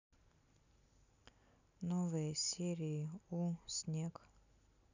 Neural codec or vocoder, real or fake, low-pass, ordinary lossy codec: none; real; 7.2 kHz; none